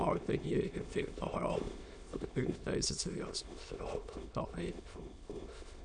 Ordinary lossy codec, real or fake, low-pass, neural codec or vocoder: none; fake; 9.9 kHz; autoencoder, 22.05 kHz, a latent of 192 numbers a frame, VITS, trained on many speakers